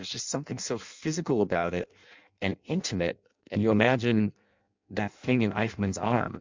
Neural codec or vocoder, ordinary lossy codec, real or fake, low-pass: codec, 16 kHz in and 24 kHz out, 0.6 kbps, FireRedTTS-2 codec; MP3, 64 kbps; fake; 7.2 kHz